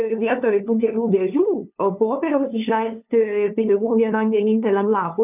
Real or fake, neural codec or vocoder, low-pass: fake; codec, 16 kHz, 2 kbps, FunCodec, trained on LibriTTS, 25 frames a second; 3.6 kHz